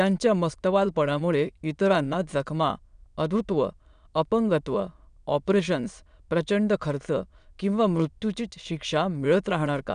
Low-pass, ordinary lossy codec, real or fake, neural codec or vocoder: 9.9 kHz; none; fake; autoencoder, 22.05 kHz, a latent of 192 numbers a frame, VITS, trained on many speakers